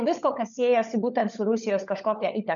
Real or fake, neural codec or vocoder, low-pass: fake; codec, 16 kHz, 16 kbps, FunCodec, trained on LibriTTS, 50 frames a second; 7.2 kHz